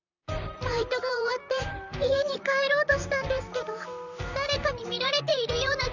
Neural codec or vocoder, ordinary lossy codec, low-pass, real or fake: vocoder, 44.1 kHz, 128 mel bands, Pupu-Vocoder; none; 7.2 kHz; fake